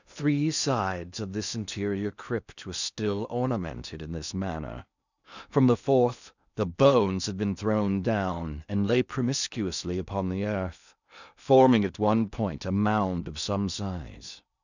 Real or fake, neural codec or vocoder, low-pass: fake; codec, 16 kHz in and 24 kHz out, 0.8 kbps, FocalCodec, streaming, 65536 codes; 7.2 kHz